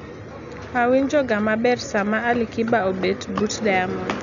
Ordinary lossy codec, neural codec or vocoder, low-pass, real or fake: none; none; 7.2 kHz; real